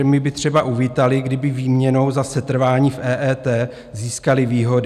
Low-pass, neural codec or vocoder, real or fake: 14.4 kHz; none; real